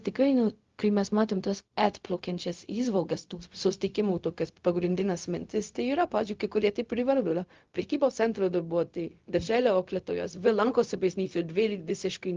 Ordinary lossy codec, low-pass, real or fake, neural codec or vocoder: Opus, 32 kbps; 7.2 kHz; fake; codec, 16 kHz, 0.4 kbps, LongCat-Audio-Codec